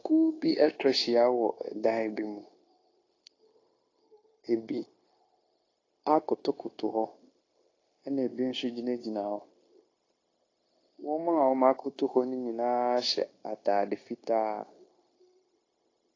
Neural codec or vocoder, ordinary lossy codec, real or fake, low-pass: codec, 16 kHz, 0.9 kbps, LongCat-Audio-Codec; AAC, 32 kbps; fake; 7.2 kHz